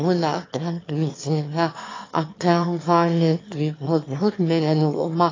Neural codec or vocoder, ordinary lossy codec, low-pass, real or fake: autoencoder, 22.05 kHz, a latent of 192 numbers a frame, VITS, trained on one speaker; AAC, 32 kbps; 7.2 kHz; fake